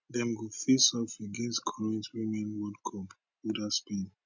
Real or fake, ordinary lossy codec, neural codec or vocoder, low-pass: real; none; none; 7.2 kHz